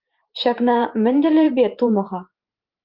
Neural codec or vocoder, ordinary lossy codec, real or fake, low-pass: vocoder, 44.1 kHz, 128 mel bands every 512 samples, BigVGAN v2; Opus, 24 kbps; fake; 5.4 kHz